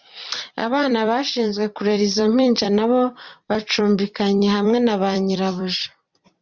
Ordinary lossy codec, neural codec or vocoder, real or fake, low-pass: Opus, 64 kbps; vocoder, 44.1 kHz, 128 mel bands every 256 samples, BigVGAN v2; fake; 7.2 kHz